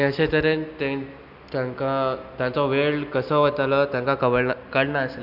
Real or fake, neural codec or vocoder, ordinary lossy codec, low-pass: real; none; none; 5.4 kHz